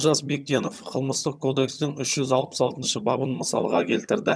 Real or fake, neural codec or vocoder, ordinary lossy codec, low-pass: fake; vocoder, 22.05 kHz, 80 mel bands, HiFi-GAN; none; none